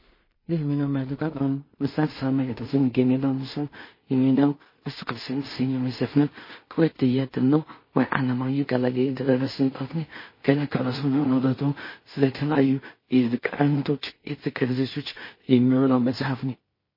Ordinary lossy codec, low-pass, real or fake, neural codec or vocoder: MP3, 24 kbps; 5.4 kHz; fake; codec, 16 kHz in and 24 kHz out, 0.4 kbps, LongCat-Audio-Codec, two codebook decoder